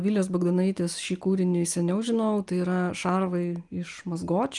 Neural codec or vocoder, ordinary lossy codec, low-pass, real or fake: none; Opus, 32 kbps; 10.8 kHz; real